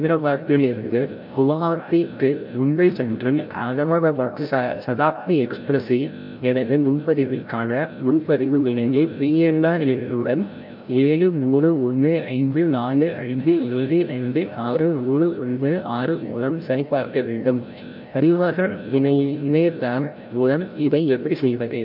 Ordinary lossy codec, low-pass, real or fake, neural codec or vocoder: MP3, 48 kbps; 5.4 kHz; fake; codec, 16 kHz, 0.5 kbps, FreqCodec, larger model